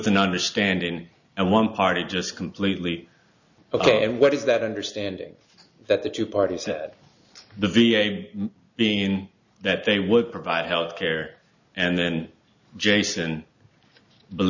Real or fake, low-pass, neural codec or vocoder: real; 7.2 kHz; none